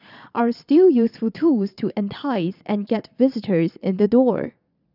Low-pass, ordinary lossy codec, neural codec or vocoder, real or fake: 5.4 kHz; none; vocoder, 22.05 kHz, 80 mel bands, Vocos; fake